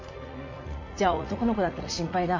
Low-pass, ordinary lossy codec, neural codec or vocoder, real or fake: 7.2 kHz; MP3, 48 kbps; vocoder, 44.1 kHz, 80 mel bands, Vocos; fake